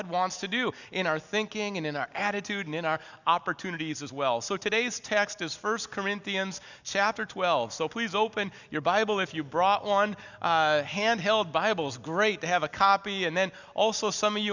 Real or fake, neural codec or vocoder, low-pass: real; none; 7.2 kHz